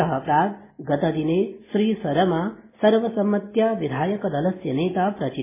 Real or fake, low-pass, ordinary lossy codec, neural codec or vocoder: real; 3.6 kHz; MP3, 16 kbps; none